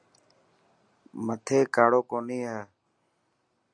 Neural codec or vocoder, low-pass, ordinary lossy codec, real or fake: none; 9.9 kHz; Opus, 64 kbps; real